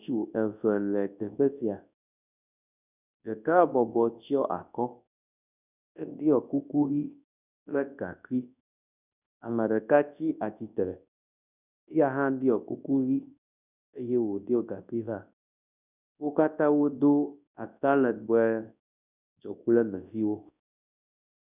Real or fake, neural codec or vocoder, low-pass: fake; codec, 24 kHz, 0.9 kbps, WavTokenizer, large speech release; 3.6 kHz